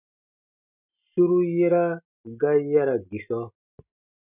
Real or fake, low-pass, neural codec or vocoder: real; 3.6 kHz; none